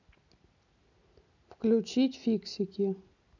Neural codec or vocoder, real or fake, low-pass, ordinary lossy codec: none; real; 7.2 kHz; none